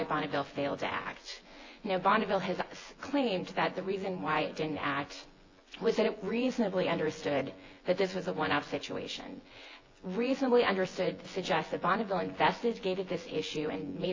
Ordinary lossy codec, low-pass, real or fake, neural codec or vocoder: AAC, 32 kbps; 7.2 kHz; fake; vocoder, 24 kHz, 100 mel bands, Vocos